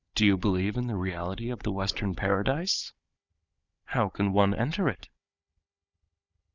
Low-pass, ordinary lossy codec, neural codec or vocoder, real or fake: 7.2 kHz; Opus, 64 kbps; codec, 16 kHz, 16 kbps, FunCodec, trained on Chinese and English, 50 frames a second; fake